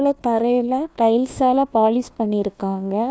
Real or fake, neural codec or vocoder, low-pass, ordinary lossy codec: fake; codec, 16 kHz, 1 kbps, FunCodec, trained on Chinese and English, 50 frames a second; none; none